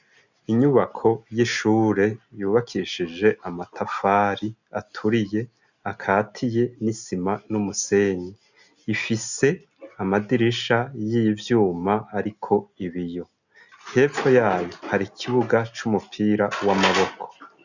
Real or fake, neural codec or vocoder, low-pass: real; none; 7.2 kHz